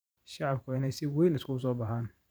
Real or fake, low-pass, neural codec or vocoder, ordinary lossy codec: fake; none; vocoder, 44.1 kHz, 128 mel bands every 512 samples, BigVGAN v2; none